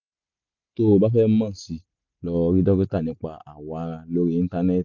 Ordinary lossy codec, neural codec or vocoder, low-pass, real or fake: none; none; 7.2 kHz; real